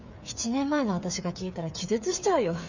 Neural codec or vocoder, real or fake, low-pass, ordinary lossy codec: codec, 16 kHz, 8 kbps, FreqCodec, smaller model; fake; 7.2 kHz; none